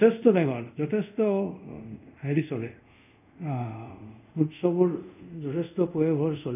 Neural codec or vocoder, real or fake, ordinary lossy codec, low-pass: codec, 24 kHz, 0.5 kbps, DualCodec; fake; none; 3.6 kHz